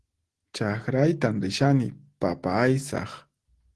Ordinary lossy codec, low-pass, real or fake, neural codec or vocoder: Opus, 16 kbps; 10.8 kHz; real; none